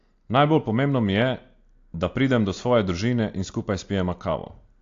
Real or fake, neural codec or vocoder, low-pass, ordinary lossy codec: real; none; 7.2 kHz; AAC, 48 kbps